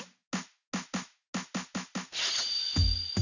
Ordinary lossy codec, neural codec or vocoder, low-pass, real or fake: none; none; 7.2 kHz; real